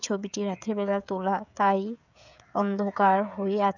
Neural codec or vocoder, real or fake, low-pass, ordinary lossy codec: codec, 16 kHz, 16 kbps, FreqCodec, smaller model; fake; 7.2 kHz; none